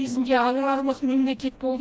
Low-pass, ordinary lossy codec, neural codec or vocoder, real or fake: none; none; codec, 16 kHz, 1 kbps, FreqCodec, smaller model; fake